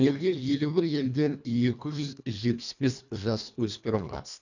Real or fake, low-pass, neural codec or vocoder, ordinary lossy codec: fake; 7.2 kHz; codec, 24 kHz, 1.5 kbps, HILCodec; none